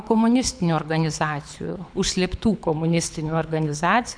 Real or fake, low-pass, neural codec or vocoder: fake; 9.9 kHz; codec, 24 kHz, 6 kbps, HILCodec